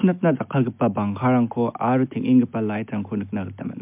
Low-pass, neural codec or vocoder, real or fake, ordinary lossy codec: 3.6 kHz; none; real; MP3, 32 kbps